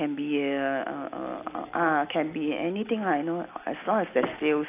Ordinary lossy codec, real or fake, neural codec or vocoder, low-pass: AAC, 24 kbps; real; none; 3.6 kHz